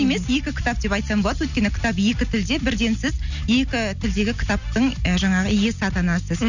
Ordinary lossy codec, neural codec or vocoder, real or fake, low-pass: none; none; real; 7.2 kHz